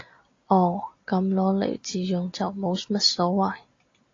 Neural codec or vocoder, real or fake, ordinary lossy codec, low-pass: none; real; AAC, 32 kbps; 7.2 kHz